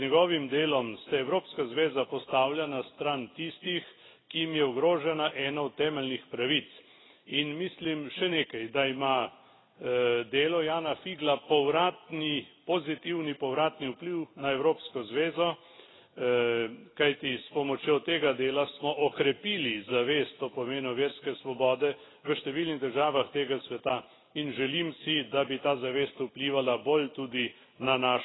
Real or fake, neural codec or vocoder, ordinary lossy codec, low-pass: real; none; AAC, 16 kbps; 7.2 kHz